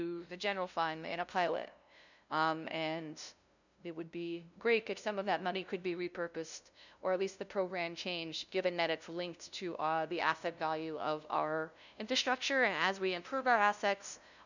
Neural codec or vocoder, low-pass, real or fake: codec, 16 kHz, 0.5 kbps, FunCodec, trained on LibriTTS, 25 frames a second; 7.2 kHz; fake